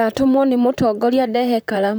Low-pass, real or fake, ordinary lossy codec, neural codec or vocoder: none; fake; none; vocoder, 44.1 kHz, 128 mel bands, Pupu-Vocoder